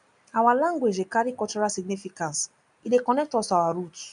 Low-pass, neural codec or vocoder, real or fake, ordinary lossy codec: 9.9 kHz; none; real; none